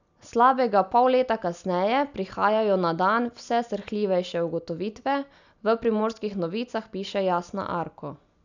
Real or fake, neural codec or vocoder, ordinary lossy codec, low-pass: real; none; none; 7.2 kHz